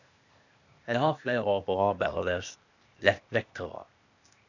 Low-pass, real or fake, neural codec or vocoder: 7.2 kHz; fake; codec, 16 kHz, 0.8 kbps, ZipCodec